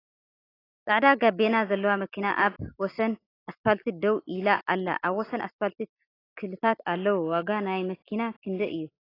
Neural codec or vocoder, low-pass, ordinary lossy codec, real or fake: none; 5.4 kHz; AAC, 24 kbps; real